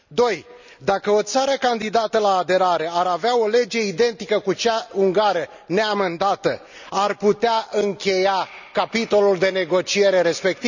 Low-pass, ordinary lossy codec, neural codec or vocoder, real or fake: 7.2 kHz; none; none; real